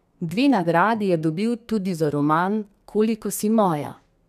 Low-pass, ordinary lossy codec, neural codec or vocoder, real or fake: 14.4 kHz; none; codec, 32 kHz, 1.9 kbps, SNAC; fake